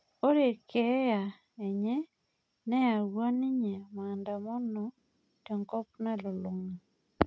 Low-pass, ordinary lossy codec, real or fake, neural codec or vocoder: none; none; real; none